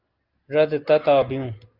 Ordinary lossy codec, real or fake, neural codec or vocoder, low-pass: Opus, 24 kbps; real; none; 5.4 kHz